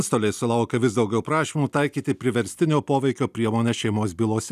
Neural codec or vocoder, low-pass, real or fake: none; 14.4 kHz; real